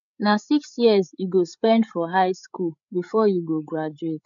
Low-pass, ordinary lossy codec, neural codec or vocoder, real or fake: 7.2 kHz; MP3, 64 kbps; codec, 16 kHz, 8 kbps, FreqCodec, larger model; fake